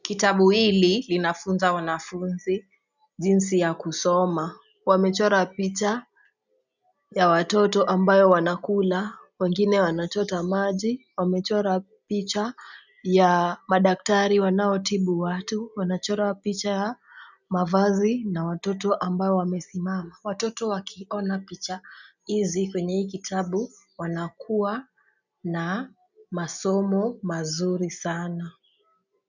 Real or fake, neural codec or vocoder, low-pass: real; none; 7.2 kHz